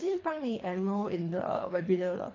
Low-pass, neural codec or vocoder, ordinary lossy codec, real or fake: 7.2 kHz; codec, 24 kHz, 3 kbps, HILCodec; AAC, 32 kbps; fake